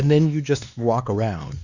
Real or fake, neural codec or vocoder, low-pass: fake; codec, 16 kHz, 2 kbps, X-Codec, WavLM features, trained on Multilingual LibriSpeech; 7.2 kHz